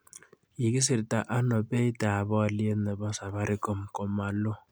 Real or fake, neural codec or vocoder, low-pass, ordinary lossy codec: real; none; none; none